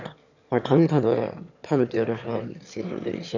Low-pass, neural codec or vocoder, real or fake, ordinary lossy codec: 7.2 kHz; autoencoder, 22.05 kHz, a latent of 192 numbers a frame, VITS, trained on one speaker; fake; none